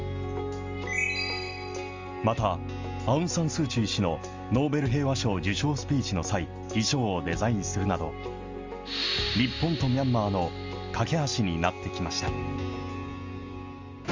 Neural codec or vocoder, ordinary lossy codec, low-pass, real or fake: none; Opus, 32 kbps; 7.2 kHz; real